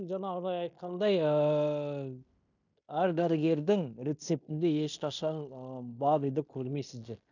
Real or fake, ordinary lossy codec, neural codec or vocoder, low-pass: fake; none; codec, 16 kHz in and 24 kHz out, 0.9 kbps, LongCat-Audio-Codec, fine tuned four codebook decoder; 7.2 kHz